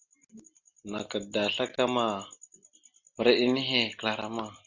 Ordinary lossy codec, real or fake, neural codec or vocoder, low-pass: Opus, 64 kbps; real; none; 7.2 kHz